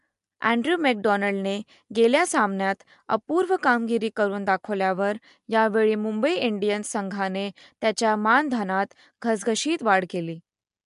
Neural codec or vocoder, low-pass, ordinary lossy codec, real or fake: none; 10.8 kHz; MP3, 64 kbps; real